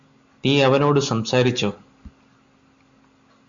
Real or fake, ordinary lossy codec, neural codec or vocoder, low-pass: real; MP3, 64 kbps; none; 7.2 kHz